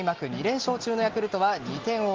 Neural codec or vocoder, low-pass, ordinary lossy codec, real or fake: none; 7.2 kHz; Opus, 16 kbps; real